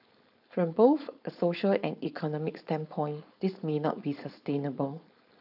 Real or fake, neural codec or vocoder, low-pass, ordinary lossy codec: fake; codec, 16 kHz, 4.8 kbps, FACodec; 5.4 kHz; AAC, 48 kbps